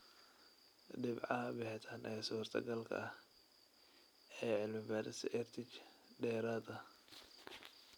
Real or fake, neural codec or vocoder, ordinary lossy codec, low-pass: real; none; none; none